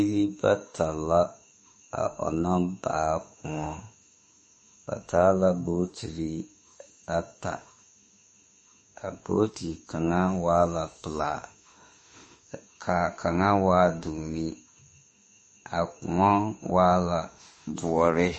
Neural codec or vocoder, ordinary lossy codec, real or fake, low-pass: autoencoder, 48 kHz, 32 numbers a frame, DAC-VAE, trained on Japanese speech; MP3, 32 kbps; fake; 10.8 kHz